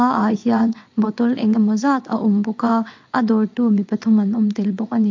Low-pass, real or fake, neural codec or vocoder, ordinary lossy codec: 7.2 kHz; fake; vocoder, 22.05 kHz, 80 mel bands, WaveNeXt; MP3, 48 kbps